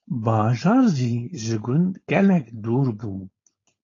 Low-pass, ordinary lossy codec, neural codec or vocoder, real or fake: 7.2 kHz; AAC, 32 kbps; codec, 16 kHz, 4.8 kbps, FACodec; fake